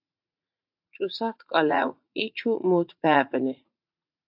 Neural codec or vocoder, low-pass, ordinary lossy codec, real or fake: vocoder, 44.1 kHz, 80 mel bands, Vocos; 5.4 kHz; AAC, 48 kbps; fake